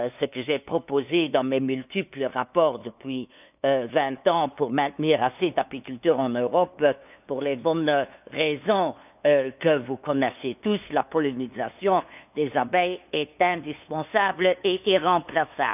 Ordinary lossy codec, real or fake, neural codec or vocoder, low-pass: none; fake; codec, 16 kHz, 2 kbps, FunCodec, trained on LibriTTS, 25 frames a second; 3.6 kHz